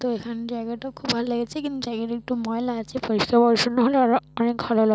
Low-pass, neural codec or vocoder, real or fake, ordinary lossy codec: none; none; real; none